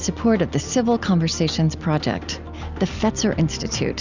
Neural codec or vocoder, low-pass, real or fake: none; 7.2 kHz; real